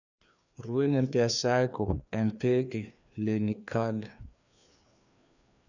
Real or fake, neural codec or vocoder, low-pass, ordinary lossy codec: fake; codec, 16 kHz, 2 kbps, FreqCodec, larger model; 7.2 kHz; none